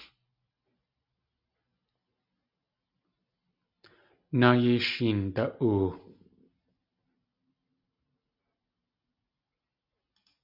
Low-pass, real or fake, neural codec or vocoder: 5.4 kHz; real; none